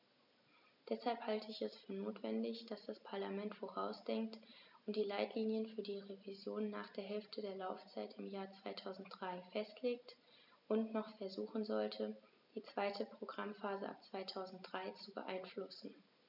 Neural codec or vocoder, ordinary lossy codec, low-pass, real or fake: none; none; 5.4 kHz; real